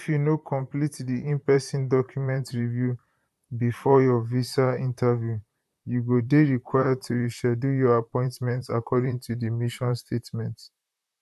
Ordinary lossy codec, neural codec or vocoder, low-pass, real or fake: none; vocoder, 44.1 kHz, 128 mel bands, Pupu-Vocoder; 14.4 kHz; fake